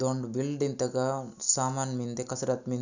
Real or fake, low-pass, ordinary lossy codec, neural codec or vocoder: real; 7.2 kHz; none; none